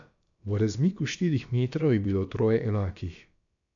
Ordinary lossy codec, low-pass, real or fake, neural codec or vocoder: AAC, 64 kbps; 7.2 kHz; fake; codec, 16 kHz, about 1 kbps, DyCAST, with the encoder's durations